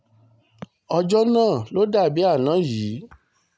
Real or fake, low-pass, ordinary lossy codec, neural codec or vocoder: real; none; none; none